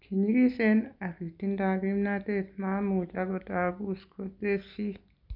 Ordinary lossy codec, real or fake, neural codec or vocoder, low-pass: none; real; none; 5.4 kHz